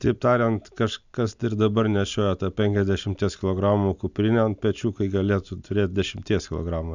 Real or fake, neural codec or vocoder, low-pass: real; none; 7.2 kHz